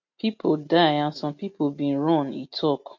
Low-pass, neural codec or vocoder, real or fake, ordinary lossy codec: 7.2 kHz; none; real; MP3, 48 kbps